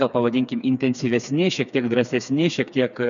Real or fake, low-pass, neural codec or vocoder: fake; 7.2 kHz; codec, 16 kHz, 4 kbps, FreqCodec, smaller model